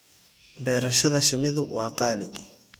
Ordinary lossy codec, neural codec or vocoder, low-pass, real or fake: none; codec, 44.1 kHz, 2.6 kbps, DAC; none; fake